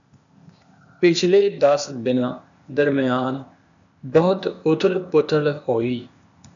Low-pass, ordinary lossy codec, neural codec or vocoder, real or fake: 7.2 kHz; MP3, 96 kbps; codec, 16 kHz, 0.8 kbps, ZipCodec; fake